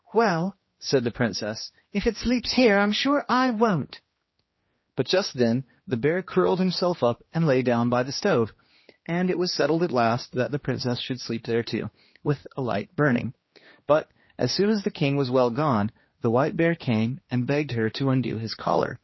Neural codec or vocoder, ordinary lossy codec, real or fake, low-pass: codec, 16 kHz, 2 kbps, X-Codec, HuBERT features, trained on general audio; MP3, 24 kbps; fake; 7.2 kHz